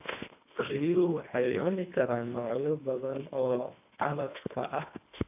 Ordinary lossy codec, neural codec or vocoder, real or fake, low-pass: AAC, 32 kbps; codec, 24 kHz, 1.5 kbps, HILCodec; fake; 3.6 kHz